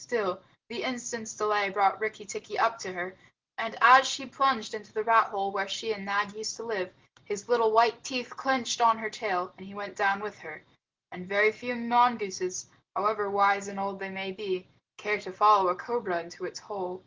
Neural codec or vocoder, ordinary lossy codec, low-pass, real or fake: none; Opus, 16 kbps; 7.2 kHz; real